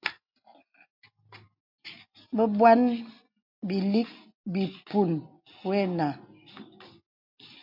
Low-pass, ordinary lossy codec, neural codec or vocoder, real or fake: 5.4 kHz; MP3, 48 kbps; none; real